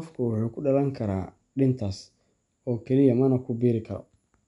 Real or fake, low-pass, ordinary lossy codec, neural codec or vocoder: real; 10.8 kHz; none; none